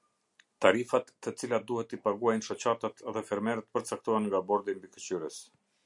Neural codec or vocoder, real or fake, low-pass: none; real; 10.8 kHz